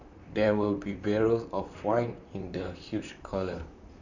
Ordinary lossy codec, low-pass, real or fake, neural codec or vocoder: none; 7.2 kHz; fake; vocoder, 44.1 kHz, 128 mel bands, Pupu-Vocoder